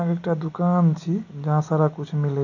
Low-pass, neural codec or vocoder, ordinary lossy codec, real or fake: 7.2 kHz; none; none; real